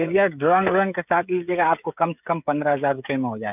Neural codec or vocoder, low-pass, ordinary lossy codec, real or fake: codec, 16 kHz, 16 kbps, FreqCodec, smaller model; 3.6 kHz; none; fake